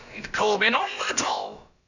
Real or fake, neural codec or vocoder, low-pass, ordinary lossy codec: fake; codec, 16 kHz, about 1 kbps, DyCAST, with the encoder's durations; 7.2 kHz; none